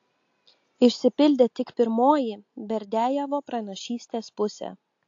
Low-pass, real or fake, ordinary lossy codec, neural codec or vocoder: 7.2 kHz; real; AAC, 48 kbps; none